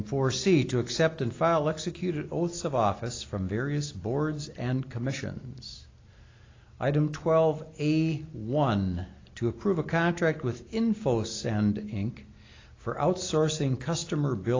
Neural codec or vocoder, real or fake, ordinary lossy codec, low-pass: none; real; AAC, 32 kbps; 7.2 kHz